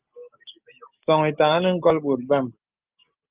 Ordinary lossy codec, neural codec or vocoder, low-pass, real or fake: Opus, 24 kbps; none; 3.6 kHz; real